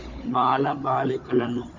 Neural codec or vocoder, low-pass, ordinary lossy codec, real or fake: codec, 16 kHz, 16 kbps, FunCodec, trained on Chinese and English, 50 frames a second; 7.2 kHz; Opus, 64 kbps; fake